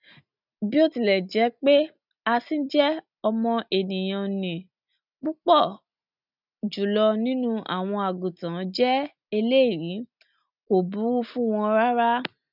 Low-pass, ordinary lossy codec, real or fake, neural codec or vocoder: 5.4 kHz; none; real; none